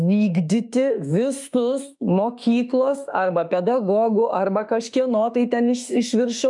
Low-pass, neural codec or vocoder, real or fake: 10.8 kHz; autoencoder, 48 kHz, 32 numbers a frame, DAC-VAE, trained on Japanese speech; fake